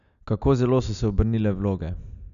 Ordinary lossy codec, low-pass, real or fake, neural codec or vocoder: none; 7.2 kHz; real; none